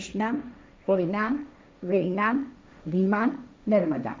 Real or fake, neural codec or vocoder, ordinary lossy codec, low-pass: fake; codec, 16 kHz, 1.1 kbps, Voila-Tokenizer; none; none